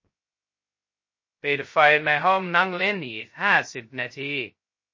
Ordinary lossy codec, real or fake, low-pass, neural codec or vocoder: MP3, 32 kbps; fake; 7.2 kHz; codec, 16 kHz, 0.2 kbps, FocalCodec